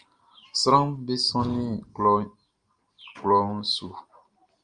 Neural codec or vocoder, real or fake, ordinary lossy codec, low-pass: none; real; Opus, 32 kbps; 9.9 kHz